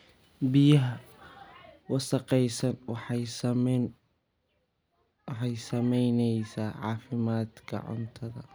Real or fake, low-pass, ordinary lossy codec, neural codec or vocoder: real; none; none; none